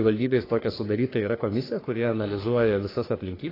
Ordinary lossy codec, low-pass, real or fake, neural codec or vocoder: AAC, 24 kbps; 5.4 kHz; fake; codec, 44.1 kHz, 3.4 kbps, Pupu-Codec